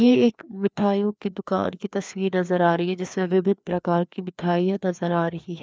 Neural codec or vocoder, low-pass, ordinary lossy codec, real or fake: codec, 16 kHz, 2 kbps, FreqCodec, larger model; none; none; fake